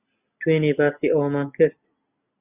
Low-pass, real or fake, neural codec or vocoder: 3.6 kHz; real; none